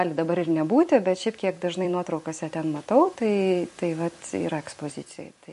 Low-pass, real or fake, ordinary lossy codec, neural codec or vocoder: 14.4 kHz; fake; MP3, 48 kbps; vocoder, 44.1 kHz, 128 mel bands every 256 samples, BigVGAN v2